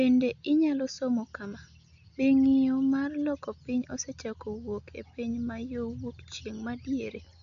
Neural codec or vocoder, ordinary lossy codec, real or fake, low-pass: none; none; real; 7.2 kHz